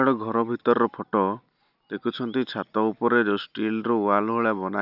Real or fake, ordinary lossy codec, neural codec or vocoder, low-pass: real; none; none; 5.4 kHz